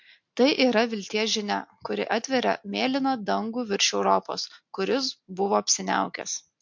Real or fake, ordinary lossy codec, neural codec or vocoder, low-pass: real; MP3, 48 kbps; none; 7.2 kHz